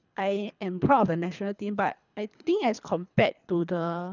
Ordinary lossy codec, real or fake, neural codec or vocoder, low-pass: none; fake; codec, 24 kHz, 3 kbps, HILCodec; 7.2 kHz